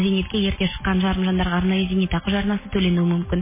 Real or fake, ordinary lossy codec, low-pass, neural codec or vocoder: real; MP3, 16 kbps; 3.6 kHz; none